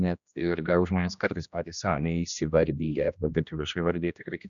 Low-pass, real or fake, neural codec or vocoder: 7.2 kHz; fake; codec, 16 kHz, 1 kbps, X-Codec, HuBERT features, trained on general audio